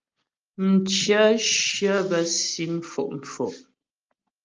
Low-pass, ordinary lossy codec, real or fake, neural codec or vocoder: 7.2 kHz; Opus, 24 kbps; real; none